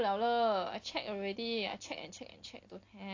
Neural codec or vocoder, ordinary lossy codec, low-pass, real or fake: none; AAC, 48 kbps; 7.2 kHz; real